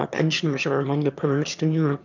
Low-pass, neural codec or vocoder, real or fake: 7.2 kHz; autoencoder, 22.05 kHz, a latent of 192 numbers a frame, VITS, trained on one speaker; fake